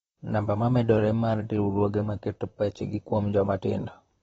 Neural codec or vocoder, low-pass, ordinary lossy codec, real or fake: vocoder, 44.1 kHz, 128 mel bands, Pupu-Vocoder; 19.8 kHz; AAC, 24 kbps; fake